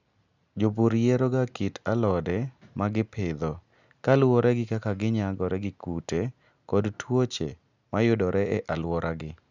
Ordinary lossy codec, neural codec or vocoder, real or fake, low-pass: none; none; real; 7.2 kHz